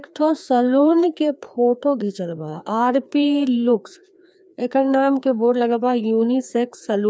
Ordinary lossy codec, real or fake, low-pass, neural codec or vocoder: none; fake; none; codec, 16 kHz, 2 kbps, FreqCodec, larger model